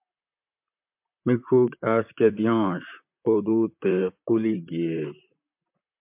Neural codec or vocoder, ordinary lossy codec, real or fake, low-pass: vocoder, 44.1 kHz, 128 mel bands, Pupu-Vocoder; MP3, 32 kbps; fake; 3.6 kHz